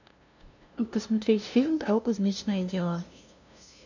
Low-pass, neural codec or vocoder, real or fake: 7.2 kHz; codec, 16 kHz, 0.5 kbps, FunCodec, trained on LibriTTS, 25 frames a second; fake